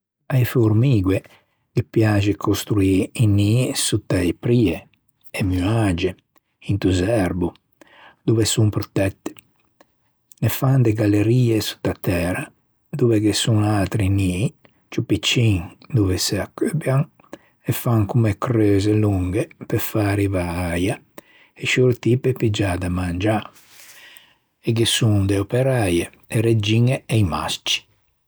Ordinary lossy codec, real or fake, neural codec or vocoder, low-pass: none; real; none; none